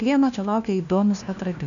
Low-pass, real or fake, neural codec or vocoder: 7.2 kHz; fake; codec, 16 kHz, 1 kbps, FunCodec, trained on LibriTTS, 50 frames a second